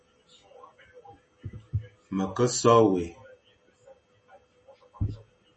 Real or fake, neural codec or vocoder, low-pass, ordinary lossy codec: real; none; 10.8 kHz; MP3, 32 kbps